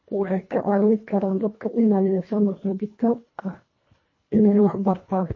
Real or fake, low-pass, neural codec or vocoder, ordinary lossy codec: fake; 7.2 kHz; codec, 24 kHz, 1.5 kbps, HILCodec; MP3, 32 kbps